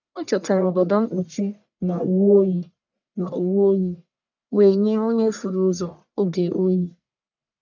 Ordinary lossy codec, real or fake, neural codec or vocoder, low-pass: none; fake; codec, 44.1 kHz, 1.7 kbps, Pupu-Codec; 7.2 kHz